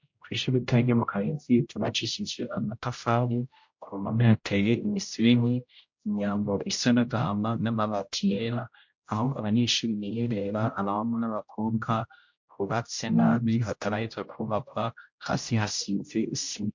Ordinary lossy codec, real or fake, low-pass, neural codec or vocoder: MP3, 48 kbps; fake; 7.2 kHz; codec, 16 kHz, 0.5 kbps, X-Codec, HuBERT features, trained on general audio